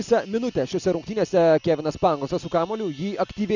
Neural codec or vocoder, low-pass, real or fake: none; 7.2 kHz; real